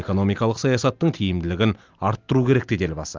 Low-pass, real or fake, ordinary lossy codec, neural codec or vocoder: 7.2 kHz; real; Opus, 24 kbps; none